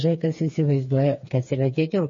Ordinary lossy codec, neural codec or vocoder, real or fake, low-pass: MP3, 32 kbps; codec, 16 kHz, 4 kbps, FreqCodec, smaller model; fake; 7.2 kHz